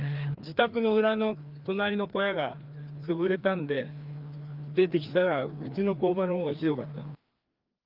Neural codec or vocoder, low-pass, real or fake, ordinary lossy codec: codec, 16 kHz, 2 kbps, FreqCodec, larger model; 5.4 kHz; fake; Opus, 32 kbps